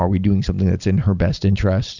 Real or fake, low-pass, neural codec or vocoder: real; 7.2 kHz; none